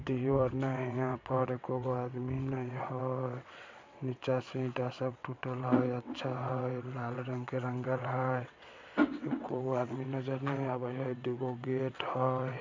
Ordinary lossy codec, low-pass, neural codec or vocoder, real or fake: MP3, 64 kbps; 7.2 kHz; vocoder, 22.05 kHz, 80 mel bands, WaveNeXt; fake